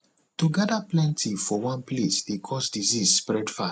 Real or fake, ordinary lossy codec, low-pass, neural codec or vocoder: real; none; none; none